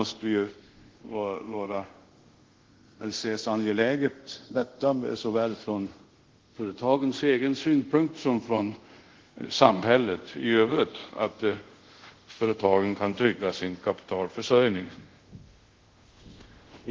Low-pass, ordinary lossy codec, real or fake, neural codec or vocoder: 7.2 kHz; Opus, 16 kbps; fake; codec, 24 kHz, 0.5 kbps, DualCodec